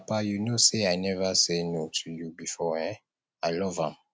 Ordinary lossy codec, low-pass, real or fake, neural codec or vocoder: none; none; real; none